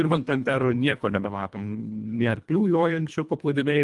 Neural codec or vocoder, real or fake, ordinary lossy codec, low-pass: codec, 24 kHz, 1.5 kbps, HILCodec; fake; Opus, 32 kbps; 10.8 kHz